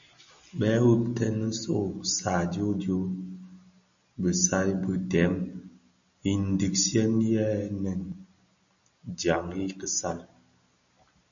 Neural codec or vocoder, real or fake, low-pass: none; real; 7.2 kHz